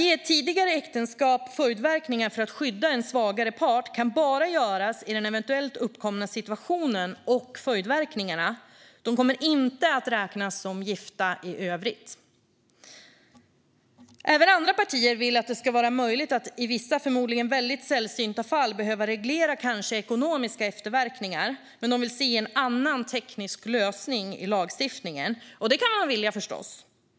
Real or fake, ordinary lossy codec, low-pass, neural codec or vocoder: real; none; none; none